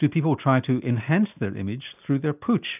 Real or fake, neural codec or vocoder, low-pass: real; none; 3.6 kHz